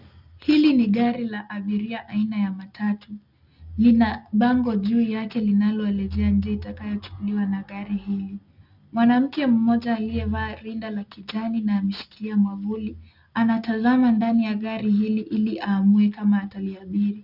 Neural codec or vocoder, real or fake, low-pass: none; real; 5.4 kHz